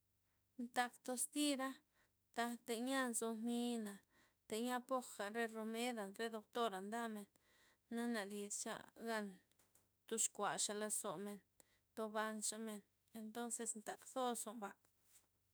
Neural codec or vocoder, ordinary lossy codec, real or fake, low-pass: autoencoder, 48 kHz, 32 numbers a frame, DAC-VAE, trained on Japanese speech; none; fake; none